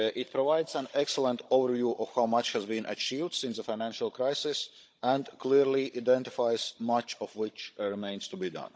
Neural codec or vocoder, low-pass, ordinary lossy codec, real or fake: codec, 16 kHz, 16 kbps, FunCodec, trained on Chinese and English, 50 frames a second; none; none; fake